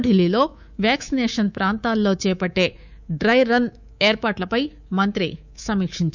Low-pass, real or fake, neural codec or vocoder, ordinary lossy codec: 7.2 kHz; fake; codec, 24 kHz, 3.1 kbps, DualCodec; none